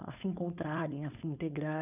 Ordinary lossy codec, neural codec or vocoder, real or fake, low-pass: none; codec, 16 kHz, 4.8 kbps, FACodec; fake; 3.6 kHz